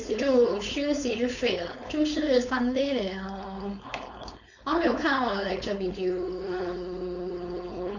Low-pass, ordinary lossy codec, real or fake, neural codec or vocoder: 7.2 kHz; none; fake; codec, 16 kHz, 4.8 kbps, FACodec